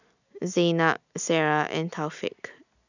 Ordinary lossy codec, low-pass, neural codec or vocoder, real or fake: none; 7.2 kHz; none; real